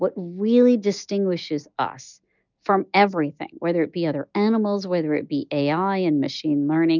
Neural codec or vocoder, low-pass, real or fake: none; 7.2 kHz; real